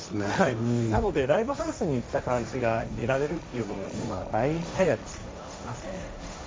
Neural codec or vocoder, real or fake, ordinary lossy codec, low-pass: codec, 16 kHz, 1.1 kbps, Voila-Tokenizer; fake; none; none